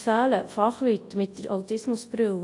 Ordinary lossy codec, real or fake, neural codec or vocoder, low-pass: AAC, 48 kbps; fake; codec, 24 kHz, 0.9 kbps, WavTokenizer, large speech release; 10.8 kHz